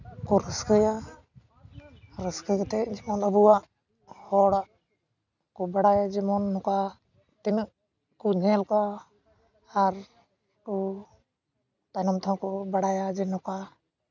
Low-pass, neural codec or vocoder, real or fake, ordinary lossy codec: 7.2 kHz; none; real; none